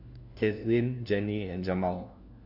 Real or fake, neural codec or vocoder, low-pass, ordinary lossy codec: fake; codec, 16 kHz, 1 kbps, FunCodec, trained on LibriTTS, 50 frames a second; 5.4 kHz; AAC, 48 kbps